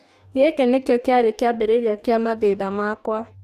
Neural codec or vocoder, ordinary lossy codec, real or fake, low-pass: codec, 44.1 kHz, 2.6 kbps, DAC; none; fake; 14.4 kHz